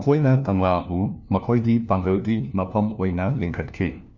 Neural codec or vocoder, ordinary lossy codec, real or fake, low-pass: codec, 16 kHz, 1 kbps, FunCodec, trained on LibriTTS, 50 frames a second; none; fake; 7.2 kHz